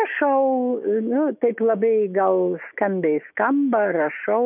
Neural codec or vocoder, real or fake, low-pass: autoencoder, 48 kHz, 128 numbers a frame, DAC-VAE, trained on Japanese speech; fake; 3.6 kHz